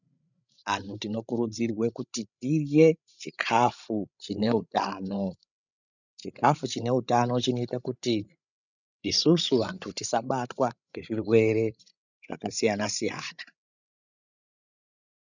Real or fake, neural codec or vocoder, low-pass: fake; codec, 16 kHz, 16 kbps, FreqCodec, larger model; 7.2 kHz